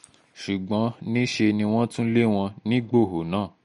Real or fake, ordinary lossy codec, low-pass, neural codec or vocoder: real; MP3, 48 kbps; 10.8 kHz; none